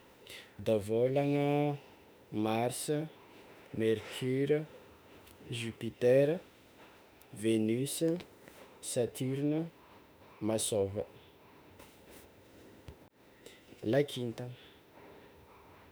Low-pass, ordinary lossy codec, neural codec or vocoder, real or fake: none; none; autoencoder, 48 kHz, 32 numbers a frame, DAC-VAE, trained on Japanese speech; fake